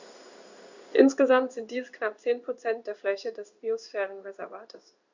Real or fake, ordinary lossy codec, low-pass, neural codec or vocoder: fake; Opus, 64 kbps; 7.2 kHz; codec, 16 kHz in and 24 kHz out, 1 kbps, XY-Tokenizer